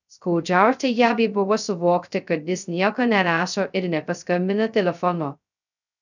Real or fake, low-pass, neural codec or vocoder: fake; 7.2 kHz; codec, 16 kHz, 0.2 kbps, FocalCodec